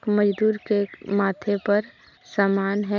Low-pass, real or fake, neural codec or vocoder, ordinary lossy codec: 7.2 kHz; real; none; none